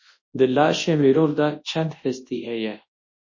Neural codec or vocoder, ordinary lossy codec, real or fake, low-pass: codec, 24 kHz, 0.9 kbps, WavTokenizer, large speech release; MP3, 32 kbps; fake; 7.2 kHz